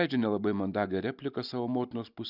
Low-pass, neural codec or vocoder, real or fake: 5.4 kHz; none; real